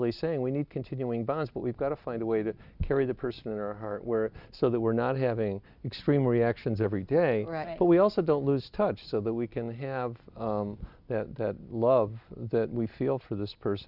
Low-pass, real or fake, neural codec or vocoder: 5.4 kHz; real; none